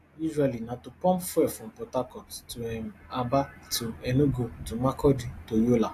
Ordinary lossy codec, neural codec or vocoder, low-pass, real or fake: AAC, 64 kbps; none; 14.4 kHz; real